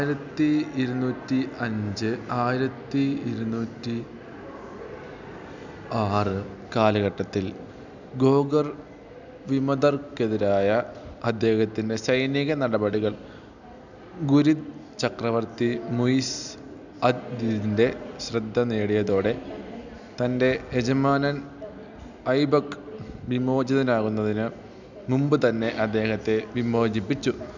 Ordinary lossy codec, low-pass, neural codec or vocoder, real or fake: none; 7.2 kHz; none; real